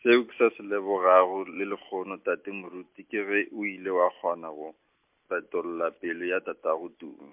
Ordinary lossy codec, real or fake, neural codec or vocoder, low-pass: MP3, 32 kbps; real; none; 3.6 kHz